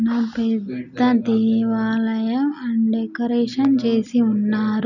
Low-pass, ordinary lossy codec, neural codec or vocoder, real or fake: 7.2 kHz; none; none; real